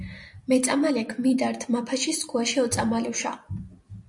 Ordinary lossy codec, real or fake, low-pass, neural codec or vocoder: MP3, 64 kbps; fake; 10.8 kHz; vocoder, 44.1 kHz, 128 mel bands every 256 samples, BigVGAN v2